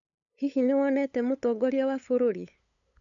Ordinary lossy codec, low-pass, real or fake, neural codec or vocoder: none; 7.2 kHz; fake; codec, 16 kHz, 8 kbps, FunCodec, trained on LibriTTS, 25 frames a second